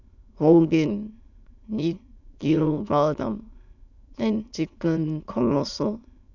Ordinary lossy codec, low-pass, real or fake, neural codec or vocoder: none; 7.2 kHz; fake; autoencoder, 22.05 kHz, a latent of 192 numbers a frame, VITS, trained on many speakers